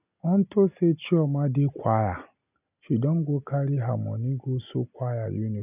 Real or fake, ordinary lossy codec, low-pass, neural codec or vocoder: real; none; 3.6 kHz; none